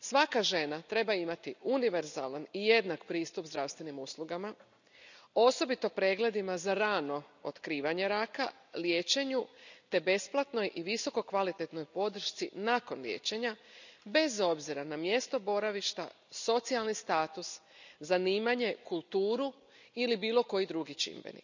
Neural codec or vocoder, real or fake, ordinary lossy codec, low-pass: none; real; none; 7.2 kHz